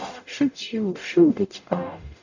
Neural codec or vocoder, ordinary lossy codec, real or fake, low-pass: codec, 44.1 kHz, 0.9 kbps, DAC; none; fake; 7.2 kHz